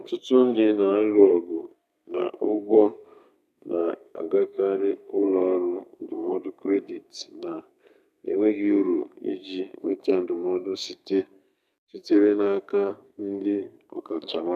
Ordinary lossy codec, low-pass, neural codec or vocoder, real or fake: none; 14.4 kHz; codec, 32 kHz, 1.9 kbps, SNAC; fake